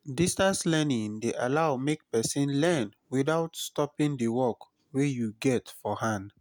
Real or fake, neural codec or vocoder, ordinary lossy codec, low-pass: real; none; none; none